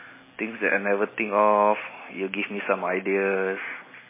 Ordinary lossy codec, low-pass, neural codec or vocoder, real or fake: MP3, 16 kbps; 3.6 kHz; none; real